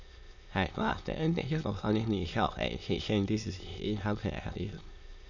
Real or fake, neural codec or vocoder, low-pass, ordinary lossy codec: fake; autoencoder, 22.05 kHz, a latent of 192 numbers a frame, VITS, trained on many speakers; 7.2 kHz; none